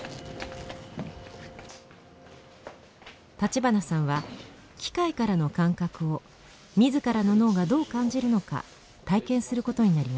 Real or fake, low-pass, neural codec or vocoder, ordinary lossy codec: real; none; none; none